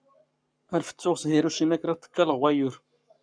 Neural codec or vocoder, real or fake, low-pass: codec, 44.1 kHz, 7.8 kbps, Pupu-Codec; fake; 9.9 kHz